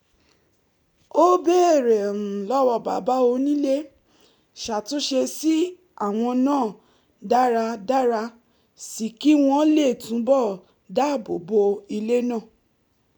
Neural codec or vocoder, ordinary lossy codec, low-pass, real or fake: none; none; 19.8 kHz; real